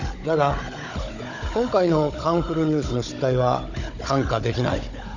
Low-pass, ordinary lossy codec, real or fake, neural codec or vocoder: 7.2 kHz; none; fake; codec, 16 kHz, 16 kbps, FunCodec, trained on Chinese and English, 50 frames a second